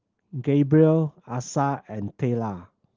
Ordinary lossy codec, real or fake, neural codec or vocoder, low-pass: Opus, 16 kbps; real; none; 7.2 kHz